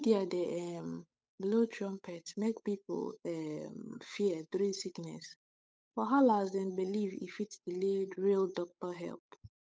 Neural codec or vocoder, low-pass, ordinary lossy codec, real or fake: codec, 16 kHz, 8 kbps, FunCodec, trained on Chinese and English, 25 frames a second; none; none; fake